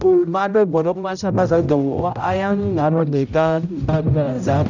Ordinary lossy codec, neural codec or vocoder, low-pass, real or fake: none; codec, 16 kHz, 0.5 kbps, X-Codec, HuBERT features, trained on general audio; 7.2 kHz; fake